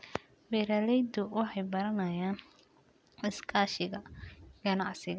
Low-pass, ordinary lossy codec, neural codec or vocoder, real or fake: none; none; none; real